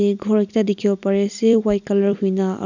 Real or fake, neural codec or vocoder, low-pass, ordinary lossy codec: fake; vocoder, 44.1 kHz, 128 mel bands every 256 samples, BigVGAN v2; 7.2 kHz; none